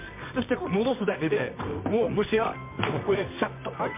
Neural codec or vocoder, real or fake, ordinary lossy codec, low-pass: codec, 24 kHz, 0.9 kbps, WavTokenizer, medium music audio release; fake; MP3, 32 kbps; 3.6 kHz